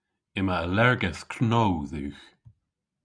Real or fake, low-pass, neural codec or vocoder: real; 9.9 kHz; none